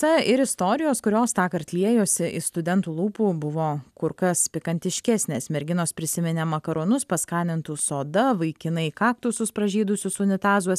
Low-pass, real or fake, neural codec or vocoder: 14.4 kHz; real; none